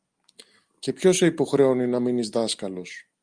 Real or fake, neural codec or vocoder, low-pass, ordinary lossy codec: real; none; 9.9 kHz; Opus, 32 kbps